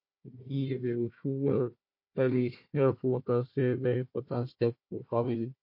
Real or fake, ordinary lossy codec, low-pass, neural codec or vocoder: fake; MP3, 32 kbps; 5.4 kHz; codec, 16 kHz, 1 kbps, FunCodec, trained on Chinese and English, 50 frames a second